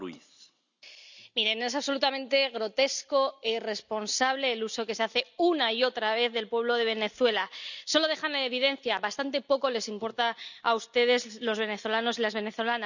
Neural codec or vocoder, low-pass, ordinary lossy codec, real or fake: none; 7.2 kHz; none; real